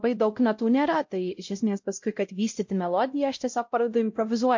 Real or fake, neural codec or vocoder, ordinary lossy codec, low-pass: fake; codec, 16 kHz, 0.5 kbps, X-Codec, WavLM features, trained on Multilingual LibriSpeech; MP3, 48 kbps; 7.2 kHz